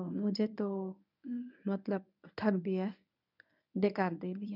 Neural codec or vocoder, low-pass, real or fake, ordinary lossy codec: codec, 24 kHz, 0.9 kbps, WavTokenizer, medium speech release version 1; 5.4 kHz; fake; none